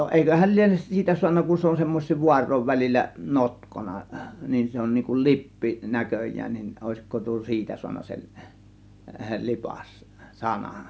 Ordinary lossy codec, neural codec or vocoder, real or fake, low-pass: none; none; real; none